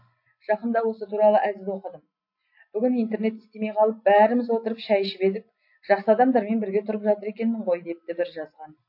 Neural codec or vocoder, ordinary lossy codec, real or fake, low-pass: none; MP3, 32 kbps; real; 5.4 kHz